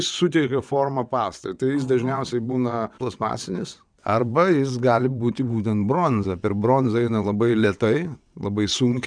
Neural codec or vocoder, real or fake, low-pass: vocoder, 22.05 kHz, 80 mel bands, WaveNeXt; fake; 9.9 kHz